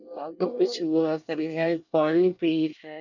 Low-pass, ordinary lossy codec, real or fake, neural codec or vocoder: 7.2 kHz; none; fake; codec, 24 kHz, 1 kbps, SNAC